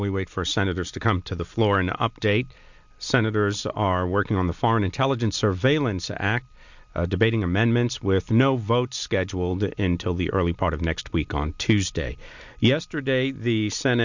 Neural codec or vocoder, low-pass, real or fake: none; 7.2 kHz; real